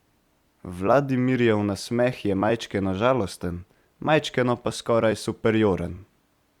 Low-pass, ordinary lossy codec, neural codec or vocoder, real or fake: 19.8 kHz; Opus, 64 kbps; vocoder, 44.1 kHz, 128 mel bands every 256 samples, BigVGAN v2; fake